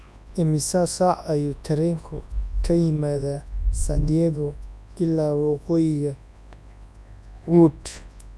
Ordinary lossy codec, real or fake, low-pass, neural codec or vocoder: none; fake; none; codec, 24 kHz, 0.9 kbps, WavTokenizer, large speech release